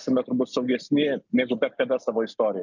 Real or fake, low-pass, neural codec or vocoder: real; 7.2 kHz; none